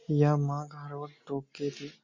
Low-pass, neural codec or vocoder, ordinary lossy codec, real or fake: 7.2 kHz; none; MP3, 48 kbps; real